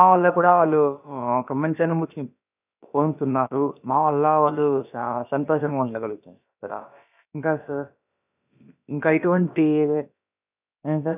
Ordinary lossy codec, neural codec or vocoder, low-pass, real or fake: none; codec, 16 kHz, about 1 kbps, DyCAST, with the encoder's durations; 3.6 kHz; fake